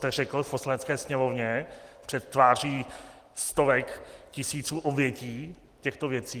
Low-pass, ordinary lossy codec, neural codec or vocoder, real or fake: 14.4 kHz; Opus, 24 kbps; none; real